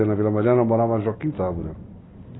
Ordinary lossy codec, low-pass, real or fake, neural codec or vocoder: AAC, 16 kbps; 7.2 kHz; real; none